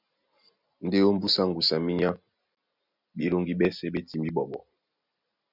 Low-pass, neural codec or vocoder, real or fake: 5.4 kHz; none; real